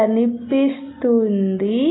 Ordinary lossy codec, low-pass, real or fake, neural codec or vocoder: AAC, 16 kbps; 7.2 kHz; real; none